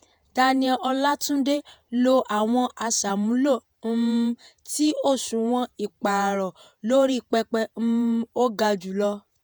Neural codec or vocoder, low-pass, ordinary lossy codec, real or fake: vocoder, 48 kHz, 128 mel bands, Vocos; none; none; fake